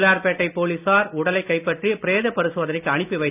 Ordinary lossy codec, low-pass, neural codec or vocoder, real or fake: none; 3.6 kHz; none; real